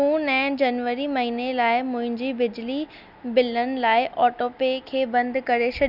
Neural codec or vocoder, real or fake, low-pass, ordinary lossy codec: none; real; 5.4 kHz; none